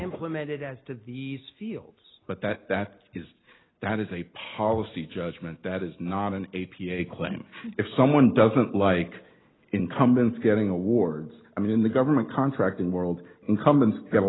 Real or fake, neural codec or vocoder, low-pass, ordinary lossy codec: real; none; 7.2 kHz; AAC, 16 kbps